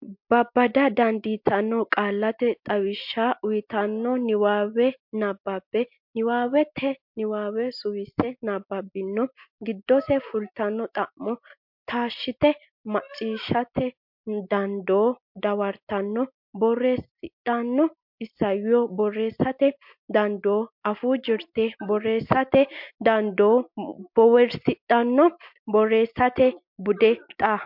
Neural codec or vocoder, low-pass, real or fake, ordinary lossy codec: none; 5.4 kHz; real; MP3, 48 kbps